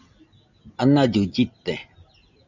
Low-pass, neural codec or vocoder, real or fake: 7.2 kHz; none; real